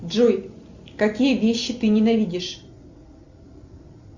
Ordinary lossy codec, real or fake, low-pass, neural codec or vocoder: Opus, 64 kbps; real; 7.2 kHz; none